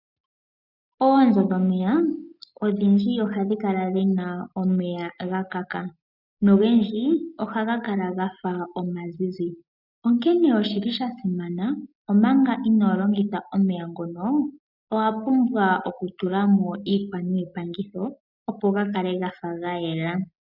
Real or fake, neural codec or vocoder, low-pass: real; none; 5.4 kHz